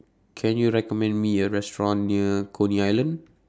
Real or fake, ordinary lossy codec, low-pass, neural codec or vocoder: real; none; none; none